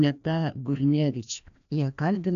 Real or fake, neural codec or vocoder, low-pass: fake; codec, 16 kHz, 1 kbps, FreqCodec, larger model; 7.2 kHz